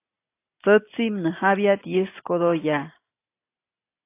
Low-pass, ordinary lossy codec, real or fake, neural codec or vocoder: 3.6 kHz; AAC, 24 kbps; real; none